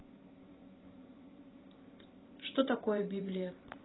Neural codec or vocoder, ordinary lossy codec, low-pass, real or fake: vocoder, 44.1 kHz, 128 mel bands every 512 samples, BigVGAN v2; AAC, 16 kbps; 7.2 kHz; fake